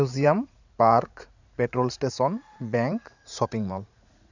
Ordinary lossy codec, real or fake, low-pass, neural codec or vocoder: none; fake; 7.2 kHz; vocoder, 44.1 kHz, 80 mel bands, Vocos